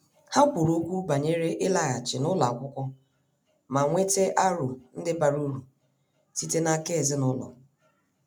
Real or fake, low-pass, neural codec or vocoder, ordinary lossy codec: real; none; none; none